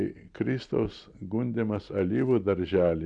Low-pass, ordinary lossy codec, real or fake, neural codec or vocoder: 9.9 kHz; MP3, 96 kbps; real; none